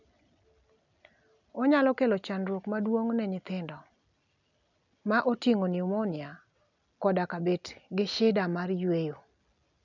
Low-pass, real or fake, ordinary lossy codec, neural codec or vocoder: 7.2 kHz; real; none; none